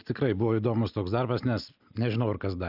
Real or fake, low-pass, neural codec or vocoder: real; 5.4 kHz; none